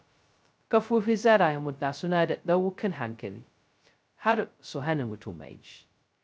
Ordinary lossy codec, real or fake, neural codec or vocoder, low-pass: none; fake; codec, 16 kHz, 0.2 kbps, FocalCodec; none